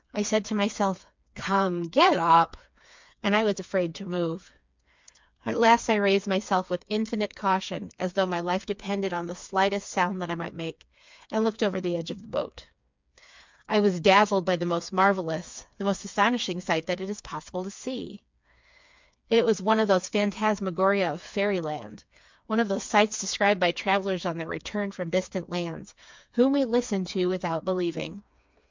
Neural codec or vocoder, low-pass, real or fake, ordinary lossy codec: codec, 16 kHz, 4 kbps, FreqCodec, smaller model; 7.2 kHz; fake; MP3, 64 kbps